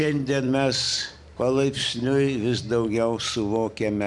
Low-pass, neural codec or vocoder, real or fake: 10.8 kHz; vocoder, 44.1 kHz, 128 mel bands every 256 samples, BigVGAN v2; fake